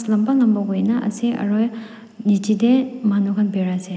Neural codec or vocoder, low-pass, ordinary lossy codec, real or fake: none; none; none; real